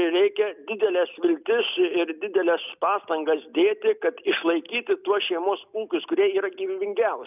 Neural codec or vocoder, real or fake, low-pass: none; real; 3.6 kHz